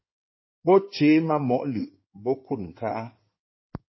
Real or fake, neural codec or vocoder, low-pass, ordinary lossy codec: fake; codec, 16 kHz in and 24 kHz out, 2.2 kbps, FireRedTTS-2 codec; 7.2 kHz; MP3, 24 kbps